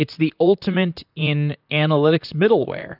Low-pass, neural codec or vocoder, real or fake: 5.4 kHz; vocoder, 44.1 kHz, 128 mel bands, Pupu-Vocoder; fake